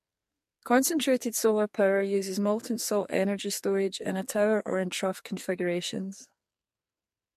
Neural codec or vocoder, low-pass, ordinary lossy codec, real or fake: codec, 44.1 kHz, 2.6 kbps, SNAC; 14.4 kHz; MP3, 64 kbps; fake